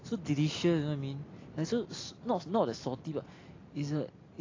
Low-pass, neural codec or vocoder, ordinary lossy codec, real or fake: 7.2 kHz; none; AAC, 32 kbps; real